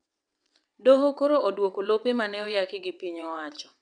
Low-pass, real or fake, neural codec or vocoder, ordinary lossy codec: 9.9 kHz; fake; vocoder, 22.05 kHz, 80 mel bands, WaveNeXt; MP3, 96 kbps